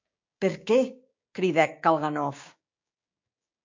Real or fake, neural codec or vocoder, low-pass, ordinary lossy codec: fake; codec, 16 kHz, 6 kbps, DAC; 7.2 kHz; MP3, 48 kbps